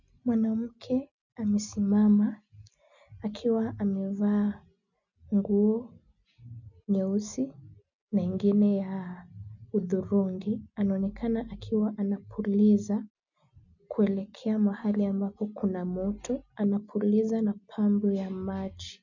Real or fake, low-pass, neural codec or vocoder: real; 7.2 kHz; none